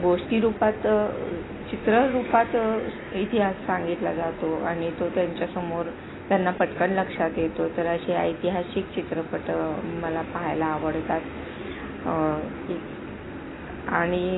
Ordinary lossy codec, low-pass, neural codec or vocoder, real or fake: AAC, 16 kbps; 7.2 kHz; none; real